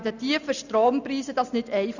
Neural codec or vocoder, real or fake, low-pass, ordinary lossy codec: none; real; 7.2 kHz; none